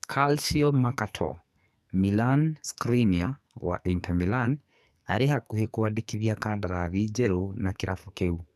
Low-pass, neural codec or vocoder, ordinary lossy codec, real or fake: 14.4 kHz; codec, 44.1 kHz, 2.6 kbps, SNAC; none; fake